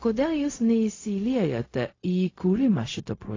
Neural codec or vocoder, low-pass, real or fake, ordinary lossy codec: codec, 16 kHz, 0.4 kbps, LongCat-Audio-Codec; 7.2 kHz; fake; AAC, 32 kbps